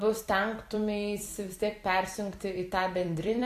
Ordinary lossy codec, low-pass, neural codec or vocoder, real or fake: AAC, 64 kbps; 14.4 kHz; vocoder, 44.1 kHz, 128 mel bands every 256 samples, BigVGAN v2; fake